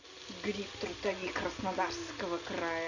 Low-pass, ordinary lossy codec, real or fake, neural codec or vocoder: 7.2 kHz; none; real; none